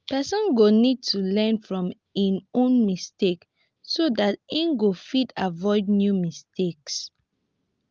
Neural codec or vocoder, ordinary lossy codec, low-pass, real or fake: none; Opus, 24 kbps; 7.2 kHz; real